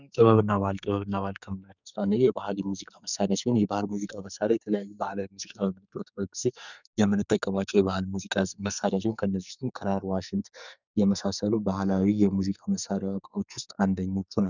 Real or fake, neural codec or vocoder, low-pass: fake; codec, 44.1 kHz, 2.6 kbps, SNAC; 7.2 kHz